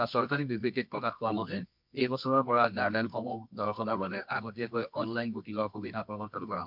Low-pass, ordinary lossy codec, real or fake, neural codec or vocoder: 5.4 kHz; MP3, 48 kbps; fake; codec, 24 kHz, 0.9 kbps, WavTokenizer, medium music audio release